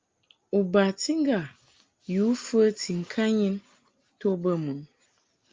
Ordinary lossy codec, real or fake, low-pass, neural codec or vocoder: Opus, 24 kbps; real; 7.2 kHz; none